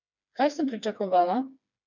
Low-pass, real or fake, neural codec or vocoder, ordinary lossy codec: 7.2 kHz; fake; codec, 16 kHz, 2 kbps, FreqCodec, smaller model; none